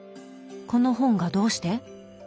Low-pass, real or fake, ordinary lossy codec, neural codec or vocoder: none; real; none; none